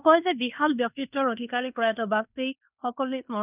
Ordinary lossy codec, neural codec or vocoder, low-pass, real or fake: none; codec, 16 kHz in and 24 kHz out, 0.9 kbps, LongCat-Audio-Codec, fine tuned four codebook decoder; 3.6 kHz; fake